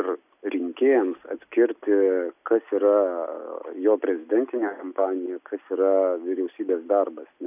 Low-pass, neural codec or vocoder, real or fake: 3.6 kHz; none; real